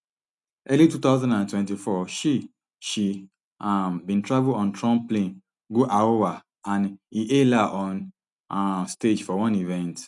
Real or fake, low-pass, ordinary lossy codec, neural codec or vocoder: real; 10.8 kHz; none; none